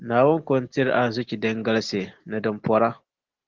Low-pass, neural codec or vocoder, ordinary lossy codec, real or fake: 7.2 kHz; none; Opus, 16 kbps; real